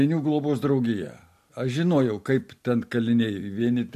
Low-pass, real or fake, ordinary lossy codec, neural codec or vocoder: 14.4 kHz; real; MP3, 64 kbps; none